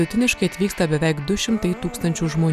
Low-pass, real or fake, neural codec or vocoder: 14.4 kHz; real; none